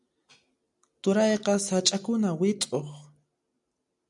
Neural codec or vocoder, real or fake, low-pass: none; real; 10.8 kHz